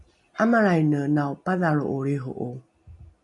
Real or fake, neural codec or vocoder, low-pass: real; none; 10.8 kHz